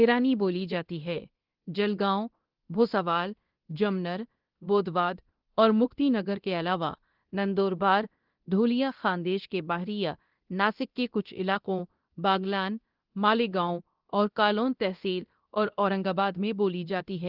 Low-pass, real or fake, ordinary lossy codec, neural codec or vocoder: 5.4 kHz; fake; Opus, 16 kbps; codec, 24 kHz, 0.9 kbps, DualCodec